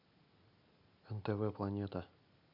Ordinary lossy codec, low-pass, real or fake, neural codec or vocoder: Opus, 64 kbps; 5.4 kHz; real; none